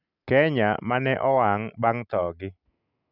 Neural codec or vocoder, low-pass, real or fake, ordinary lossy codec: none; 5.4 kHz; real; none